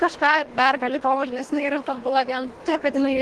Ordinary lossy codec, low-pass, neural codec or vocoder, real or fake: Opus, 16 kbps; 10.8 kHz; codec, 24 kHz, 1.5 kbps, HILCodec; fake